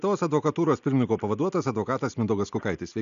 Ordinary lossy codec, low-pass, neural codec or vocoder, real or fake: AAC, 96 kbps; 7.2 kHz; none; real